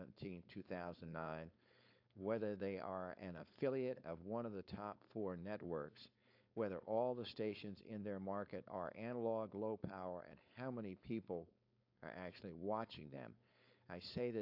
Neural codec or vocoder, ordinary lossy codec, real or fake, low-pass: codec, 16 kHz, 4.8 kbps, FACodec; AAC, 48 kbps; fake; 5.4 kHz